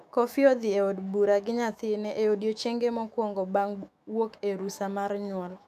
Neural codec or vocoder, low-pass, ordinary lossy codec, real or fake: autoencoder, 48 kHz, 128 numbers a frame, DAC-VAE, trained on Japanese speech; 14.4 kHz; none; fake